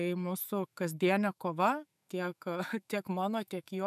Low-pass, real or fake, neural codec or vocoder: 14.4 kHz; fake; autoencoder, 48 kHz, 128 numbers a frame, DAC-VAE, trained on Japanese speech